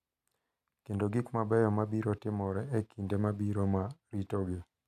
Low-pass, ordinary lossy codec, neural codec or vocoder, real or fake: 14.4 kHz; none; none; real